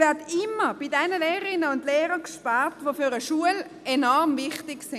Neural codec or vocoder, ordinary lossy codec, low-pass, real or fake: none; MP3, 96 kbps; 14.4 kHz; real